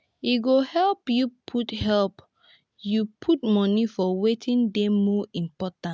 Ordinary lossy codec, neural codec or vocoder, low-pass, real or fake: none; none; none; real